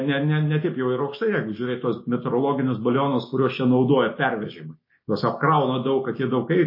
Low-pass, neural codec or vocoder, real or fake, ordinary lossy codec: 5.4 kHz; none; real; MP3, 24 kbps